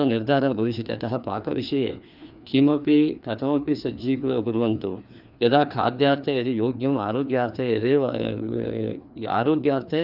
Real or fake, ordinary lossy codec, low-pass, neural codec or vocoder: fake; none; 5.4 kHz; codec, 16 kHz, 2 kbps, FreqCodec, larger model